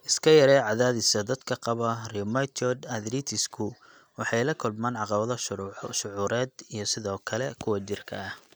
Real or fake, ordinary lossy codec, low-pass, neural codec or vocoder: real; none; none; none